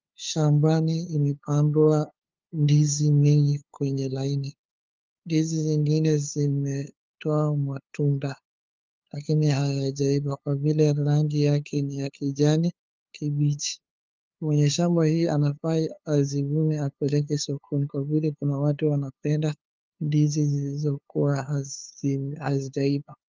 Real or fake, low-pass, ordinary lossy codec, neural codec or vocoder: fake; 7.2 kHz; Opus, 32 kbps; codec, 16 kHz, 2 kbps, FunCodec, trained on LibriTTS, 25 frames a second